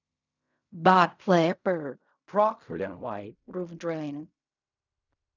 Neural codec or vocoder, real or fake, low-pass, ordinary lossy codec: codec, 16 kHz in and 24 kHz out, 0.4 kbps, LongCat-Audio-Codec, fine tuned four codebook decoder; fake; 7.2 kHz; none